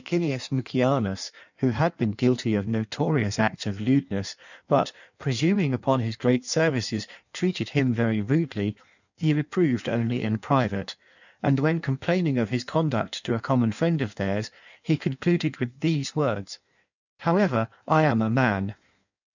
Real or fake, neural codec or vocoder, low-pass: fake; codec, 16 kHz in and 24 kHz out, 1.1 kbps, FireRedTTS-2 codec; 7.2 kHz